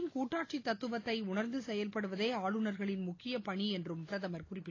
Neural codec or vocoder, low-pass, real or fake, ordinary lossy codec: none; 7.2 kHz; real; AAC, 32 kbps